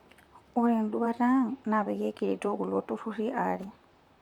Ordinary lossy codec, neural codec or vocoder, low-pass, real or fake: none; vocoder, 44.1 kHz, 128 mel bands, Pupu-Vocoder; 19.8 kHz; fake